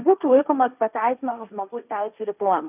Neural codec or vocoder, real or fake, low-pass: codec, 16 kHz, 1.1 kbps, Voila-Tokenizer; fake; 3.6 kHz